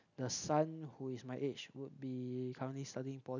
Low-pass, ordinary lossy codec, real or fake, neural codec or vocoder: 7.2 kHz; none; real; none